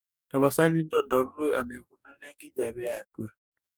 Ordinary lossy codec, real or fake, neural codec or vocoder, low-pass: none; fake; codec, 44.1 kHz, 2.6 kbps, DAC; none